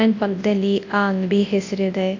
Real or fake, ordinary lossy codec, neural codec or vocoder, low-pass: fake; AAC, 48 kbps; codec, 24 kHz, 0.9 kbps, WavTokenizer, large speech release; 7.2 kHz